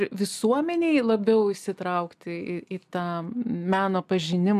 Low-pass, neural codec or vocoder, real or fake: 14.4 kHz; none; real